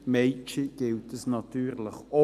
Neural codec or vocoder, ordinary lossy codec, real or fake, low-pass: none; none; real; 14.4 kHz